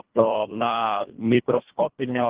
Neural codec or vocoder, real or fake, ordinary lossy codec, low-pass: codec, 24 kHz, 1.5 kbps, HILCodec; fake; Opus, 16 kbps; 3.6 kHz